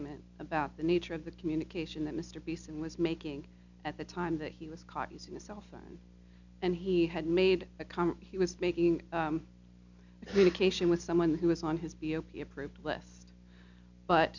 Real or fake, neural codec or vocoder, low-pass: real; none; 7.2 kHz